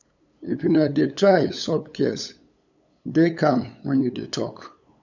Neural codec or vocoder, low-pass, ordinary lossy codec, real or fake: codec, 16 kHz, 8 kbps, FunCodec, trained on LibriTTS, 25 frames a second; 7.2 kHz; none; fake